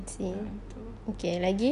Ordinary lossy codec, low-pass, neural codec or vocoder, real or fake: none; 10.8 kHz; none; real